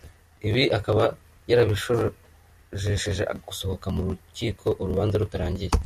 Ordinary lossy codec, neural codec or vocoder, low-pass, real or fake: MP3, 64 kbps; none; 14.4 kHz; real